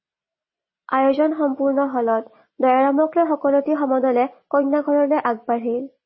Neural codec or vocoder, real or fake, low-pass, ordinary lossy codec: none; real; 7.2 kHz; MP3, 24 kbps